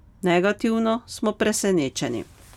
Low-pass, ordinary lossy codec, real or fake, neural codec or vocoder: 19.8 kHz; none; real; none